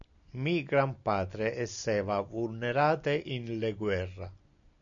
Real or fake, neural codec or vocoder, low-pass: real; none; 7.2 kHz